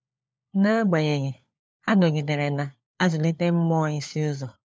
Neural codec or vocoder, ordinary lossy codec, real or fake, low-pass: codec, 16 kHz, 4 kbps, FunCodec, trained on LibriTTS, 50 frames a second; none; fake; none